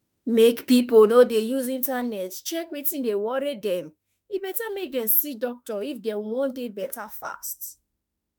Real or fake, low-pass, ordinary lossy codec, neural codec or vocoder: fake; none; none; autoencoder, 48 kHz, 32 numbers a frame, DAC-VAE, trained on Japanese speech